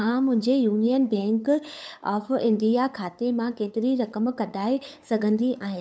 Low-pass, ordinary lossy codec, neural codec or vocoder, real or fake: none; none; codec, 16 kHz, 8 kbps, FunCodec, trained on LibriTTS, 25 frames a second; fake